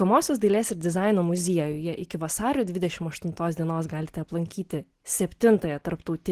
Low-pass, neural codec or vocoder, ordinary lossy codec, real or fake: 14.4 kHz; none; Opus, 16 kbps; real